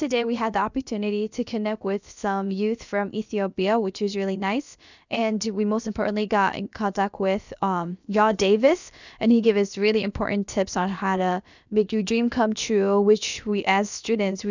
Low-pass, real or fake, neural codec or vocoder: 7.2 kHz; fake; codec, 16 kHz, about 1 kbps, DyCAST, with the encoder's durations